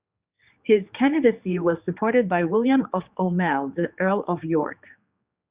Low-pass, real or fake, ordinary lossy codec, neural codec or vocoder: 3.6 kHz; fake; Opus, 64 kbps; codec, 16 kHz, 4 kbps, X-Codec, HuBERT features, trained on general audio